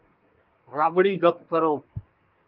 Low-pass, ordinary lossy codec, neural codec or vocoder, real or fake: 5.4 kHz; Opus, 32 kbps; codec, 24 kHz, 1 kbps, SNAC; fake